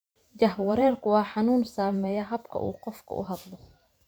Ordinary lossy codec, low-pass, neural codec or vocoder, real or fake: none; none; vocoder, 44.1 kHz, 128 mel bands every 512 samples, BigVGAN v2; fake